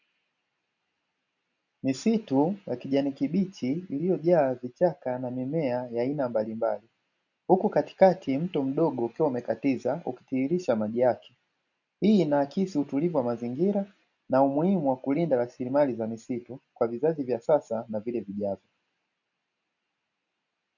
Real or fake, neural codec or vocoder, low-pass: real; none; 7.2 kHz